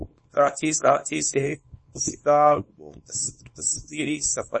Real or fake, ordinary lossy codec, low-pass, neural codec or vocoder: fake; MP3, 32 kbps; 10.8 kHz; codec, 24 kHz, 0.9 kbps, WavTokenizer, small release